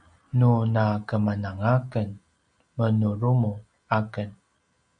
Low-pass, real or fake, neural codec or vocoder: 9.9 kHz; real; none